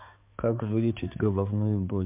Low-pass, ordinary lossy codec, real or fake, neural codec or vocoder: 3.6 kHz; MP3, 32 kbps; fake; codec, 16 kHz, 4 kbps, X-Codec, HuBERT features, trained on balanced general audio